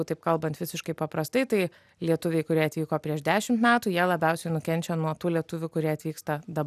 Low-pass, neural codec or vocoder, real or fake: 14.4 kHz; none; real